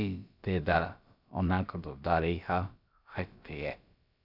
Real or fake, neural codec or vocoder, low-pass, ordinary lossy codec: fake; codec, 16 kHz, about 1 kbps, DyCAST, with the encoder's durations; 5.4 kHz; none